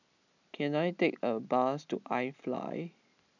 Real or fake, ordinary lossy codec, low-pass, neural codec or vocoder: real; none; 7.2 kHz; none